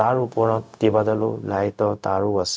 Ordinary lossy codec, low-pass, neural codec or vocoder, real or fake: none; none; codec, 16 kHz, 0.4 kbps, LongCat-Audio-Codec; fake